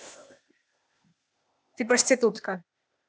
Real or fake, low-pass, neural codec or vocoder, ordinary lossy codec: fake; none; codec, 16 kHz, 0.8 kbps, ZipCodec; none